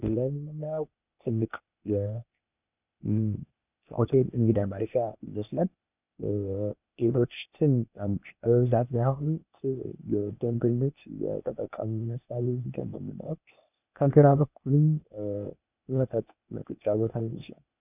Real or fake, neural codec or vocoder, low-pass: fake; codec, 16 kHz, 0.8 kbps, ZipCodec; 3.6 kHz